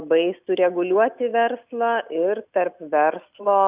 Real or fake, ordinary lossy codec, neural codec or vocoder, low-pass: real; Opus, 64 kbps; none; 3.6 kHz